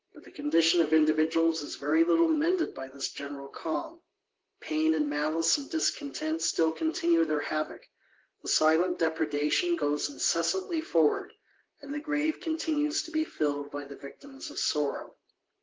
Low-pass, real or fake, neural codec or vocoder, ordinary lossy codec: 7.2 kHz; fake; vocoder, 44.1 kHz, 128 mel bands, Pupu-Vocoder; Opus, 16 kbps